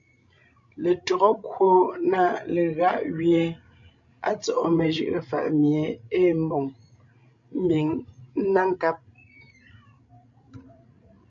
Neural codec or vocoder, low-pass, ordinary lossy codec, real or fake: codec, 16 kHz, 16 kbps, FreqCodec, larger model; 7.2 kHz; MP3, 64 kbps; fake